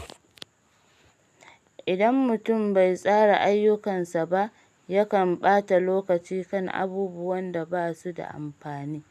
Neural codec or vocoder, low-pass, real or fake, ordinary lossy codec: none; 14.4 kHz; real; none